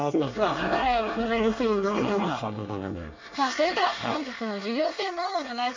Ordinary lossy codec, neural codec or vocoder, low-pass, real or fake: MP3, 64 kbps; codec, 24 kHz, 1 kbps, SNAC; 7.2 kHz; fake